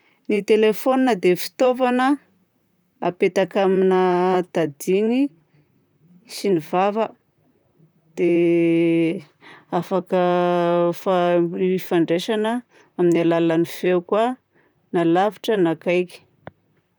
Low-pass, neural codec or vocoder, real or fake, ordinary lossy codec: none; vocoder, 44.1 kHz, 128 mel bands, Pupu-Vocoder; fake; none